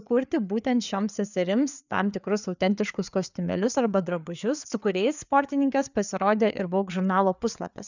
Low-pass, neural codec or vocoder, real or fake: 7.2 kHz; codec, 16 kHz, 4 kbps, FreqCodec, larger model; fake